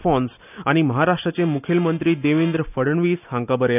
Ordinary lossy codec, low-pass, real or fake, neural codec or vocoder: none; 3.6 kHz; real; none